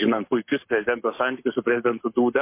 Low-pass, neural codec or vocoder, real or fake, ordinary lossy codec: 3.6 kHz; codec, 44.1 kHz, 7.8 kbps, Pupu-Codec; fake; MP3, 24 kbps